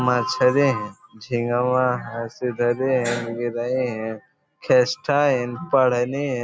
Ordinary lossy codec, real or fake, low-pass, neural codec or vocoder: none; real; none; none